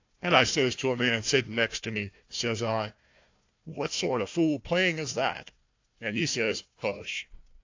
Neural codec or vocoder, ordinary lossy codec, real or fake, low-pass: codec, 16 kHz, 1 kbps, FunCodec, trained on Chinese and English, 50 frames a second; AAC, 48 kbps; fake; 7.2 kHz